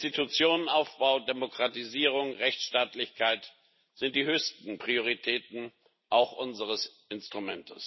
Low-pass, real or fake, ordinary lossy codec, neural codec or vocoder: 7.2 kHz; real; MP3, 24 kbps; none